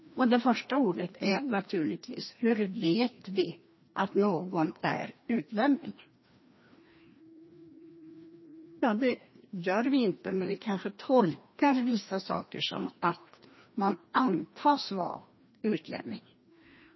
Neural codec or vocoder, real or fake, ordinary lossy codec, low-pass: codec, 16 kHz, 1 kbps, FreqCodec, larger model; fake; MP3, 24 kbps; 7.2 kHz